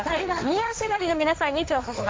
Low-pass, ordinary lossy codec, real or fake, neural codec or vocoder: none; none; fake; codec, 16 kHz, 1.1 kbps, Voila-Tokenizer